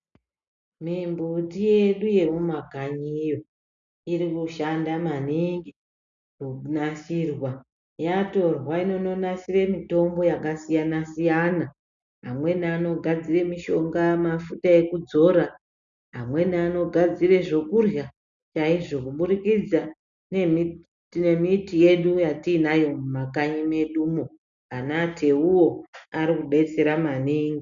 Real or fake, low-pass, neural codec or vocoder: real; 7.2 kHz; none